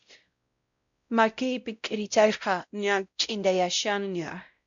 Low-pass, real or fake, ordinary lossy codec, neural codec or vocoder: 7.2 kHz; fake; MP3, 64 kbps; codec, 16 kHz, 0.5 kbps, X-Codec, WavLM features, trained on Multilingual LibriSpeech